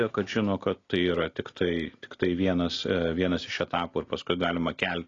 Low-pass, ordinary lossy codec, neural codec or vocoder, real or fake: 7.2 kHz; AAC, 32 kbps; none; real